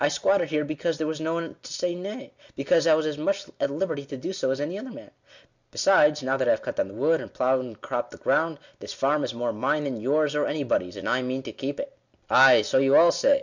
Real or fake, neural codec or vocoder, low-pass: real; none; 7.2 kHz